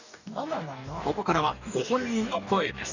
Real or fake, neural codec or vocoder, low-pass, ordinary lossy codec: fake; codec, 44.1 kHz, 2.6 kbps, DAC; 7.2 kHz; none